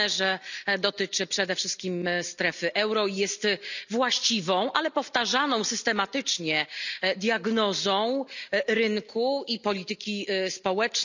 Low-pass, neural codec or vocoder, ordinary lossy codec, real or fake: 7.2 kHz; none; none; real